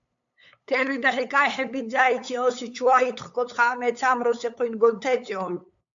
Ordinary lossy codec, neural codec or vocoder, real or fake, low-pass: AAC, 64 kbps; codec, 16 kHz, 8 kbps, FunCodec, trained on LibriTTS, 25 frames a second; fake; 7.2 kHz